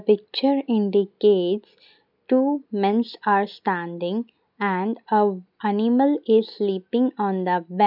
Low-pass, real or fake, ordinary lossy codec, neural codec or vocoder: 5.4 kHz; real; none; none